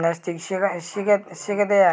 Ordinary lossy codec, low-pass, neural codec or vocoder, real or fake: none; none; none; real